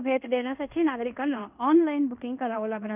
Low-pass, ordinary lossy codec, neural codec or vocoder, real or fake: 3.6 kHz; none; codec, 16 kHz in and 24 kHz out, 0.9 kbps, LongCat-Audio-Codec, fine tuned four codebook decoder; fake